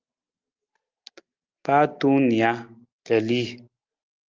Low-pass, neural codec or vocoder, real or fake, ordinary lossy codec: 7.2 kHz; none; real; Opus, 24 kbps